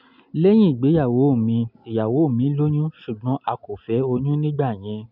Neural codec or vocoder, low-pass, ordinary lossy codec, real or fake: none; 5.4 kHz; none; real